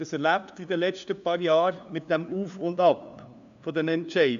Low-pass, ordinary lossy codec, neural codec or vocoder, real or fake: 7.2 kHz; AAC, 96 kbps; codec, 16 kHz, 2 kbps, FunCodec, trained on LibriTTS, 25 frames a second; fake